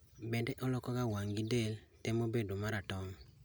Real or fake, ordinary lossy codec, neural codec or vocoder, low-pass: real; none; none; none